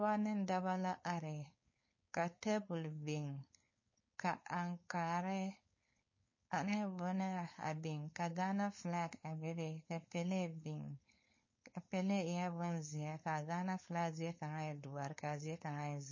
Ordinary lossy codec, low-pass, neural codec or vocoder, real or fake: MP3, 32 kbps; 7.2 kHz; codec, 16 kHz, 4.8 kbps, FACodec; fake